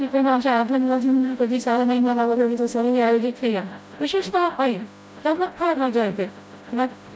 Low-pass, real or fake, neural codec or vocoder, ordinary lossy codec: none; fake; codec, 16 kHz, 0.5 kbps, FreqCodec, smaller model; none